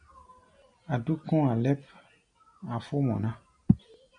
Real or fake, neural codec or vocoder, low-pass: real; none; 9.9 kHz